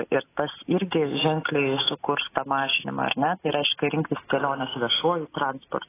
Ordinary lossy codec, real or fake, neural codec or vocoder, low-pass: AAC, 16 kbps; real; none; 3.6 kHz